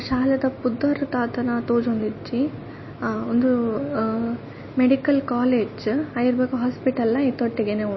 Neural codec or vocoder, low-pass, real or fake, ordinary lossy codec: none; 7.2 kHz; real; MP3, 24 kbps